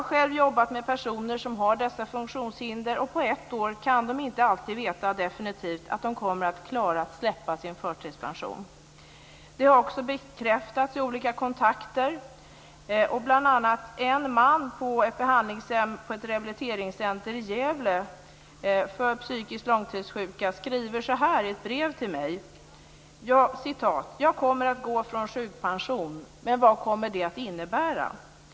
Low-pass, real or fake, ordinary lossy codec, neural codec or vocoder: none; real; none; none